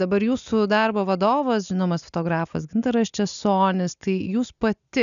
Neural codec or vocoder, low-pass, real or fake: none; 7.2 kHz; real